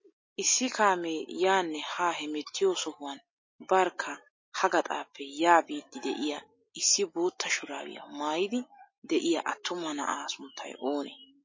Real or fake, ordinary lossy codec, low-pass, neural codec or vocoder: real; MP3, 32 kbps; 7.2 kHz; none